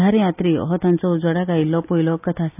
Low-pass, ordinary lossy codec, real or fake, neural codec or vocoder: 3.6 kHz; none; real; none